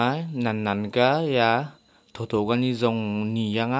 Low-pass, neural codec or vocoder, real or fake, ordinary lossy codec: none; none; real; none